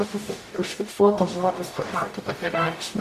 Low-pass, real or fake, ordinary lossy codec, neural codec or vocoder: 14.4 kHz; fake; MP3, 96 kbps; codec, 44.1 kHz, 0.9 kbps, DAC